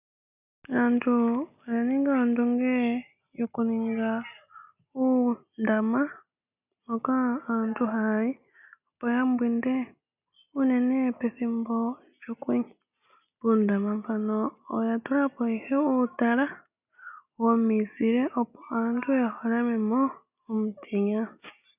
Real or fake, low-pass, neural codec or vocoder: real; 3.6 kHz; none